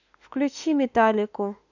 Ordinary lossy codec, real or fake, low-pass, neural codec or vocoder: none; fake; 7.2 kHz; autoencoder, 48 kHz, 32 numbers a frame, DAC-VAE, trained on Japanese speech